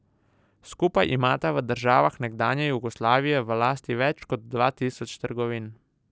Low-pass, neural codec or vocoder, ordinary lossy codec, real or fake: none; none; none; real